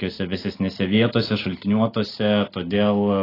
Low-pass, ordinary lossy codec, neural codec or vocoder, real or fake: 5.4 kHz; AAC, 24 kbps; none; real